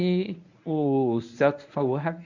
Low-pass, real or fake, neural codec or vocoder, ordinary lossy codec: 7.2 kHz; fake; codec, 24 kHz, 0.9 kbps, WavTokenizer, medium speech release version 1; none